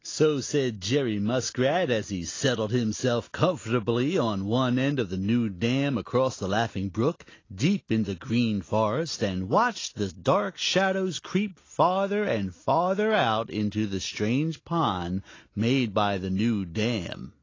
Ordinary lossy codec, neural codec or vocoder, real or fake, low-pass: AAC, 32 kbps; none; real; 7.2 kHz